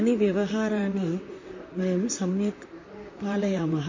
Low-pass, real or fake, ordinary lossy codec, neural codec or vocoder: 7.2 kHz; fake; MP3, 32 kbps; vocoder, 44.1 kHz, 128 mel bands, Pupu-Vocoder